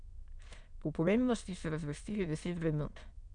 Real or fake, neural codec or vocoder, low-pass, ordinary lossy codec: fake; autoencoder, 22.05 kHz, a latent of 192 numbers a frame, VITS, trained on many speakers; 9.9 kHz; none